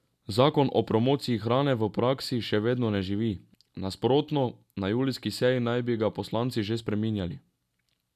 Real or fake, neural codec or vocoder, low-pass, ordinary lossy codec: real; none; 14.4 kHz; none